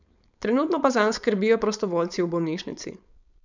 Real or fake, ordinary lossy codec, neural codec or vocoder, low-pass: fake; none; codec, 16 kHz, 4.8 kbps, FACodec; 7.2 kHz